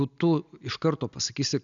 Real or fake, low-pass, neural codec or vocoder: real; 7.2 kHz; none